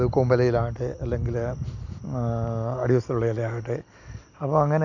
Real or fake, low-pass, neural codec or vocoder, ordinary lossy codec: real; 7.2 kHz; none; none